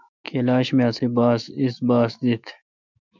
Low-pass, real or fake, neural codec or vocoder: 7.2 kHz; fake; autoencoder, 48 kHz, 128 numbers a frame, DAC-VAE, trained on Japanese speech